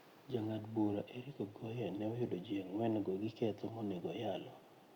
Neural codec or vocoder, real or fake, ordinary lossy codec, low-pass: none; real; Opus, 64 kbps; 19.8 kHz